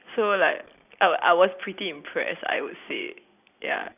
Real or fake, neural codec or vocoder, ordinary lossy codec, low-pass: real; none; none; 3.6 kHz